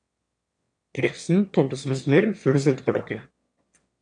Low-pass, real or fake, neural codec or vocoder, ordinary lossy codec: 9.9 kHz; fake; autoencoder, 22.05 kHz, a latent of 192 numbers a frame, VITS, trained on one speaker; AAC, 64 kbps